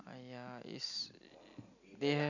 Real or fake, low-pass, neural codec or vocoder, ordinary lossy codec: real; 7.2 kHz; none; none